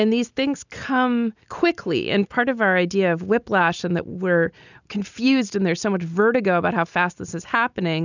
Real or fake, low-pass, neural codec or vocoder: real; 7.2 kHz; none